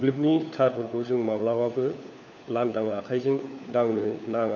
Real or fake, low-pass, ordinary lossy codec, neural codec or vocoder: fake; 7.2 kHz; none; codec, 16 kHz, 4 kbps, FunCodec, trained on LibriTTS, 50 frames a second